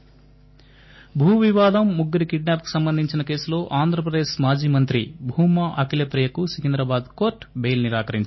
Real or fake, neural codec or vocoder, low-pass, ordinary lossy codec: real; none; 7.2 kHz; MP3, 24 kbps